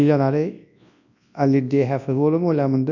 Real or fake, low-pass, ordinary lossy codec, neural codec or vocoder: fake; 7.2 kHz; none; codec, 24 kHz, 0.9 kbps, WavTokenizer, large speech release